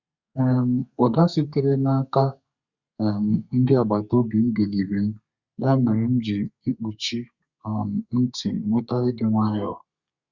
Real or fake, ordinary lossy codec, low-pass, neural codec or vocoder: fake; Opus, 64 kbps; 7.2 kHz; codec, 32 kHz, 1.9 kbps, SNAC